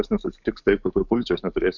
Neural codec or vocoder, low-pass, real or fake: codec, 44.1 kHz, 7.8 kbps, DAC; 7.2 kHz; fake